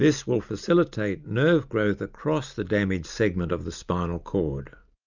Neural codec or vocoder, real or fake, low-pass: none; real; 7.2 kHz